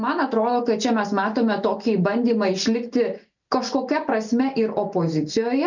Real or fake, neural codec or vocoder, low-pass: real; none; 7.2 kHz